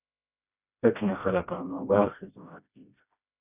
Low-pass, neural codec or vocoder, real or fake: 3.6 kHz; codec, 16 kHz, 1 kbps, FreqCodec, smaller model; fake